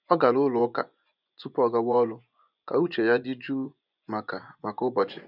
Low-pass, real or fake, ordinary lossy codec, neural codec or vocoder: 5.4 kHz; fake; none; vocoder, 24 kHz, 100 mel bands, Vocos